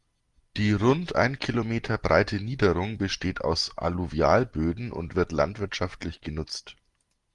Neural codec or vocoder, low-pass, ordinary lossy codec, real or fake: none; 10.8 kHz; Opus, 24 kbps; real